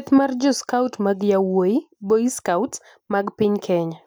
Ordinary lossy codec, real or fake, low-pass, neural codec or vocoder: none; real; none; none